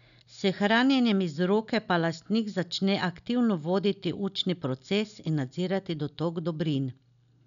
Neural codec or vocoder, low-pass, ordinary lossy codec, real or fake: none; 7.2 kHz; none; real